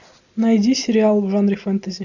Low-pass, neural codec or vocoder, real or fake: 7.2 kHz; none; real